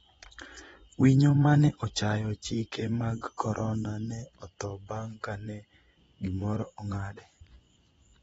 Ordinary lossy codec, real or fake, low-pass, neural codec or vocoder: AAC, 24 kbps; fake; 10.8 kHz; vocoder, 24 kHz, 100 mel bands, Vocos